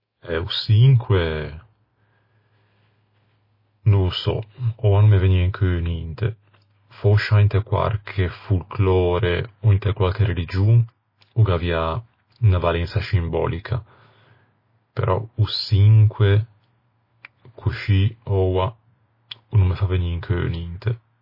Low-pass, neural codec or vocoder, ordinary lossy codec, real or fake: 5.4 kHz; none; MP3, 24 kbps; real